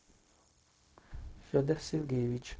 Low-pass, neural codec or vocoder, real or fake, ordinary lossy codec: none; codec, 16 kHz, 0.4 kbps, LongCat-Audio-Codec; fake; none